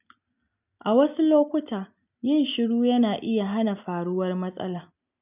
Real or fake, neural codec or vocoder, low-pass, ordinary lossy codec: real; none; 3.6 kHz; none